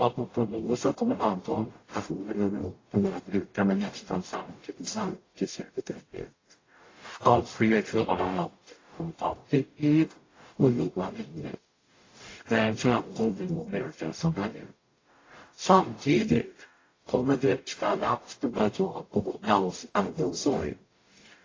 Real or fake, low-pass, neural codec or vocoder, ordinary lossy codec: fake; 7.2 kHz; codec, 44.1 kHz, 0.9 kbps, DAC; AAC, 32 kbps